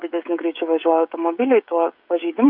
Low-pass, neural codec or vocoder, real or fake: 5.4 kHz; codec, 16 kHz, 16 kbps, FreqCodec, smaller model; fake